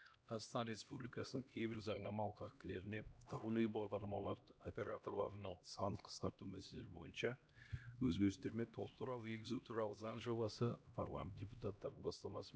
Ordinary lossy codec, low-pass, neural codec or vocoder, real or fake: none; none; codec, 16 kHz, 1 kbps, X-Codec, HuBERT features, trained on LibriSpeech; fake